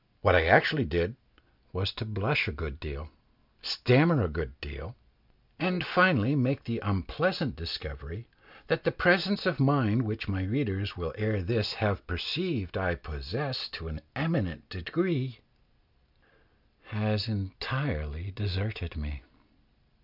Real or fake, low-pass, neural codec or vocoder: real; 5.4 kHz; none